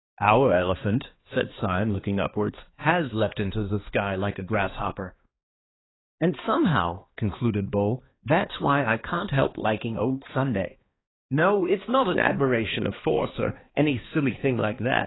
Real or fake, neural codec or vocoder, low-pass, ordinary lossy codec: fake; codec, 16 kHz, 2 kbps, X-Codec, HuBERT features, trained on balanced general audio; 7.2 kHz; AAC, 16 kbps